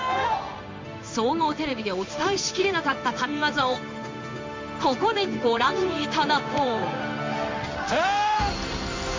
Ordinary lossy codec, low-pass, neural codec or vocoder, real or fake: MP3, 48 kbps; 7.2 kHz; codec, 16 kHz in and 24 kHz out, 1 kbps, XY-Tokenizer; fake